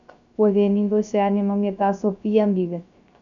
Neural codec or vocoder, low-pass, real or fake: codec, 16 kHz, 0.3 kbps, FocalCodec; 7.2 kHz; fake